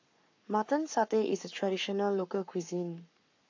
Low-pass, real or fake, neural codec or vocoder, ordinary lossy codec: 7.2 kHz; fake; codec, 44.1 kHz, 7.8 kbps, Pupu-Codec; AAC, 48 kbps